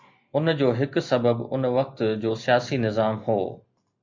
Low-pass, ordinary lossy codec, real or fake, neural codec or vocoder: 7.2 kHz; MP3, 48 kbps; real; none